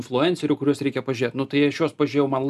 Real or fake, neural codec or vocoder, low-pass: real; none; 14.4 kHz